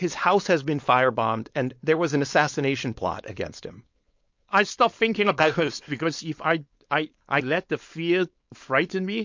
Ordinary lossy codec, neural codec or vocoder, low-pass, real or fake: MP3, 48 kbps; codec, 16 kHz, 4.8 kbps, FACodec; 7.2 kHz; fake